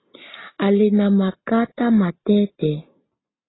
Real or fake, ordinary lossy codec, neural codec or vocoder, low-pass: real; AAC, 16 kbps; none; 7.2 kHz